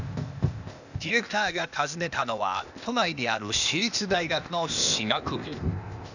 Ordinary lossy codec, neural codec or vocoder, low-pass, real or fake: none; codec, 16 kHz, 0.8 kbps, ZipCodec; 7.2 kHz; fake